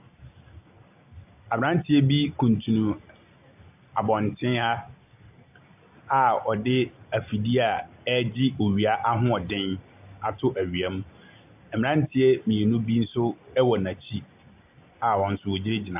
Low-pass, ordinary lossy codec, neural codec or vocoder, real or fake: 3.6 kHz; AAC, 32 kbps; none; real